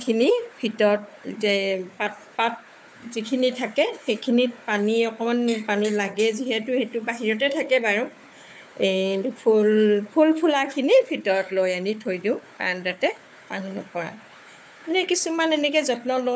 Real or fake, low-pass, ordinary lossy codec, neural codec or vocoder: fake; none; none; codec, 16 kHz, 4 kbps, FunCodec, trained on Chinese and English, 50 frames a second